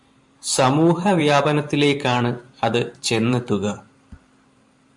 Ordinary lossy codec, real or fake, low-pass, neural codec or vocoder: MP3, 64 kbps; fake; 10.8 kHz; vocoder, 44.1 kHz, 128 mel bands every 512 samples, BigVGAN v2